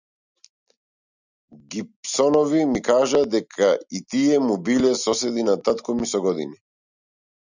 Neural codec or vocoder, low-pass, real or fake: none; 7.2 kHz; real